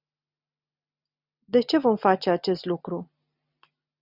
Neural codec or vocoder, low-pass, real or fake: none; 5.4 kHz; real